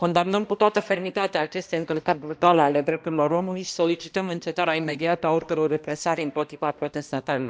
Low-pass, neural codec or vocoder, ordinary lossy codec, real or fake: none; codec, 16 kHz, 1 kbps, X-Codec, HuBERT features, trained on balanced general audio; none; fake